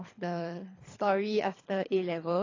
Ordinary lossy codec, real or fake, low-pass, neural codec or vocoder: AAC, 32 kbps; fake; 7.2 kHz; codec, 24 kHz, 3 kbps, HILCodec